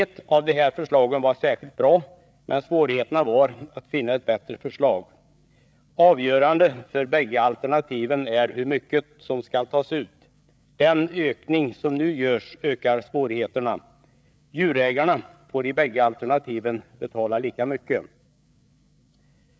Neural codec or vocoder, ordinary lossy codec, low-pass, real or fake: codec, 16 kHz, 8 kbps, FreqCodec, larger model; none; none; fake